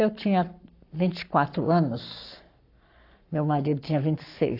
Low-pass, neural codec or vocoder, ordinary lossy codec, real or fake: 5.4 kHz; codec, 44.1 kHz, 7.8 kbps, Pupu-Codec; AAC, 32 kbps; fake